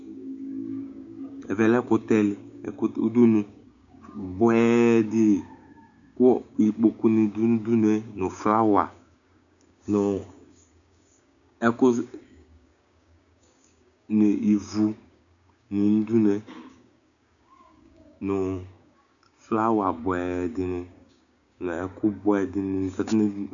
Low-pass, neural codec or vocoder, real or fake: 7.2 kHz; codec, 16 kHz, 6 kbps, DAC; fake